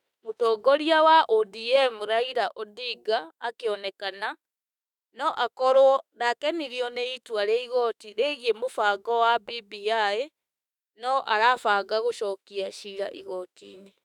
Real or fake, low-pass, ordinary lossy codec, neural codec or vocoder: fake; 19.8 kHz; none; autoencoder, 48 kHz, 32 numbers a frame, DAC-VAE, trained on Japanese speech